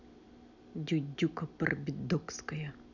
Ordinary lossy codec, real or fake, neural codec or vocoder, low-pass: none; real; none; 7.2 kHz